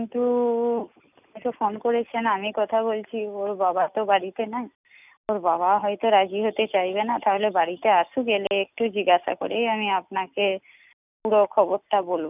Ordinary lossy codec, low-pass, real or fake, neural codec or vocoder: none; 3.6 kHz; real; none